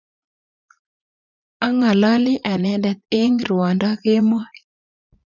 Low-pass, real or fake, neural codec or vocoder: 7.2 kHz; fake; vocoder, 44.1 kHz, 80 mel bands, Vocos